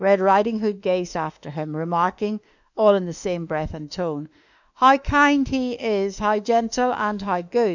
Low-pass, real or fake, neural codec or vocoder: 7.2 kHz; fake; autoencoder, 48 kHz, 32 numbers a frame, DAC-VAE, trained on Japanese speech